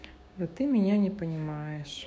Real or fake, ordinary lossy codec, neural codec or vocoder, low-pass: fake; none; codec, 16 kHz, 6 kbps, DAC; none